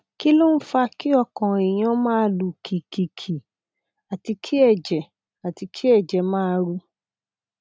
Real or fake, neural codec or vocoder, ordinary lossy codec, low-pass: real; none; none; none